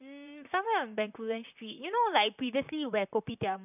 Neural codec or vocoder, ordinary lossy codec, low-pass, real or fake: vocoder, 44.1 kHz, 128 mel bands, Pupu-Vocoder; none; 3.6 kHz; fake